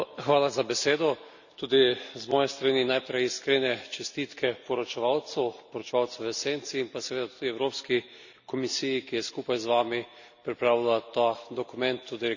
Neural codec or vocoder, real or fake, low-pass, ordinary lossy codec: none; real; 7.2 kHz; none